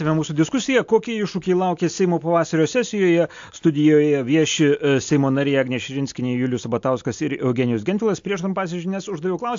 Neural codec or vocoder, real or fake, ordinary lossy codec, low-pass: none; real; AAC, 64 kbps; 7.2 kHz